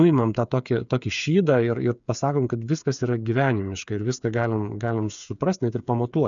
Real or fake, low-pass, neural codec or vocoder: fake; 7.2 kHz; codec, 16 kHz, 8 kbps, FreqCodec, smaller model